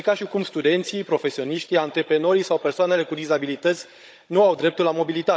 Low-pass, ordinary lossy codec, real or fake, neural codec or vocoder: none; none; fake; codec, 16 kHz, 16 kbps, FunCodec, trained on Chinese and English, 50 frames a second